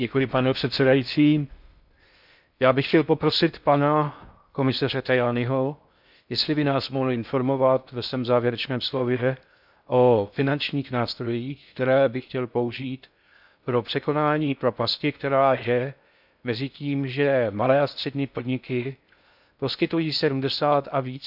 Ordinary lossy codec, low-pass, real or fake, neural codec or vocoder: none; 5.4 kHz; fake; codec, 16 kHz in and 24 kHz out, 0.6 kbps, FocalCodec, streaming, 4096 codes